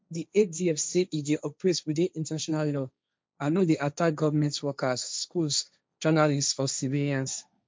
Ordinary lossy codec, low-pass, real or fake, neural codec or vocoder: none; none; fake; codec, 16 kHz, 1.1 kbps, Voila-Tokenizer